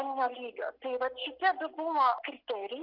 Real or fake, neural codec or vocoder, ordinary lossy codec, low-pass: real; none; Opus, 64 kbps; 5.4 kHz